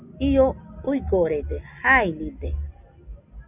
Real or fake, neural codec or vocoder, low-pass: real; none; 3.6 kHz